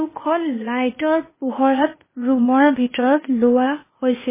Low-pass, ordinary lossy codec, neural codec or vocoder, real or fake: 3.6 kHz; MP3, 16 kbps; codec, 16 kHz, 0.8 kbps, ZipCodec; fake